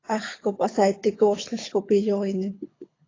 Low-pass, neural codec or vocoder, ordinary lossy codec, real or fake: 7.2 kHz; codec, 24 kHz, 6 kbps, HILCodec; AAC, 32 kbps; fake